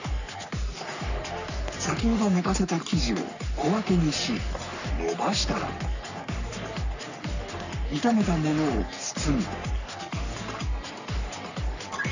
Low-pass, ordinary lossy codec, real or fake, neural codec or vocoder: 7.2 kHz; none; fake; codec, 44.1 kHz, 3.4 kbps, Pupu-Codec